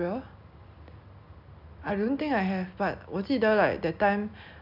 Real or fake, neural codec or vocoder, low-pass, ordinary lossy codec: real; none; 5.4 kHz; none